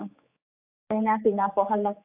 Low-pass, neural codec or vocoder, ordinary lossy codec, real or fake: 3.6 kHz; codec, 16 kHz, 4 kbps, X-Codec, HuBERT features, trained on general audio; AAC, 32 kbps; fake